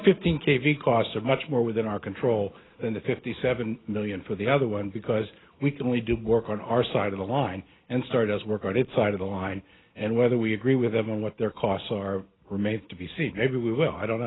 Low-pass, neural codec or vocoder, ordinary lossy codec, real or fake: 7.2 kHz; none; AAC, 16 kbps; real